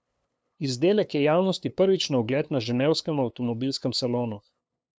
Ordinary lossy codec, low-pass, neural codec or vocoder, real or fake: none; none; codec, 16 kHz, 2 kbps, FunCodec, trained on LibriTTS, 25 frames a second; fake